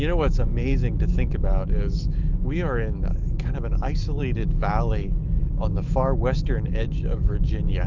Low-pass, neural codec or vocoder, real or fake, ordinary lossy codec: 7.2 kHz; autoencoder, 48 kHz, 128 numbers a frame, DAC-VAE, trained on Japanese speech; fake; Opus, 32 kbps